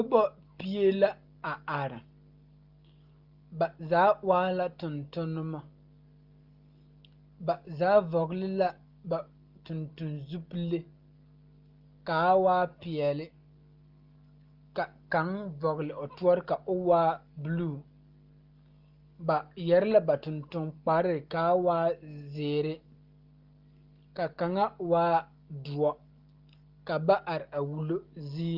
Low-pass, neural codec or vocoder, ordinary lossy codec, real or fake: 5.4 kHz; none; Opus, 24 kbps; real